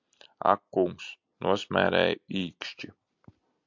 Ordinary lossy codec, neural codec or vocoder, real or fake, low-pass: MP3, 64 kbps; none; real; 7.2 kHz